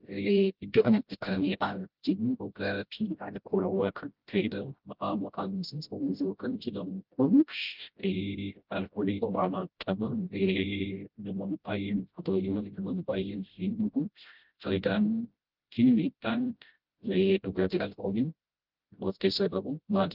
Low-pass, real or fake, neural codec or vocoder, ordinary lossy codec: 5.4 kHz; fake; codec, 16 kHz, 0.5 kbps, FreqCodec, smaller model; Opus, 24 kbps